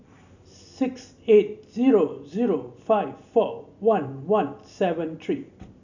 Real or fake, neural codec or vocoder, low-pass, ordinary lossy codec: real; none; 7.2 kHz; none